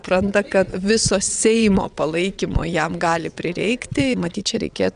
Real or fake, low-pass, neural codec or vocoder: fake; 9.9 kHz; vocoder, 22.05 kHz, 80 mel bands, WaveNeXt